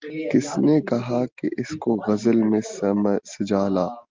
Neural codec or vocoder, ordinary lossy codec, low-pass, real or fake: none; Opus, 32 kbps; 7.2 kHz; real